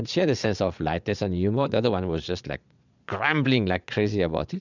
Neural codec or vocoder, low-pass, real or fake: vocoder, 22.05 kHz, 80 mel bands, Vocos; 7.2 kHz; fake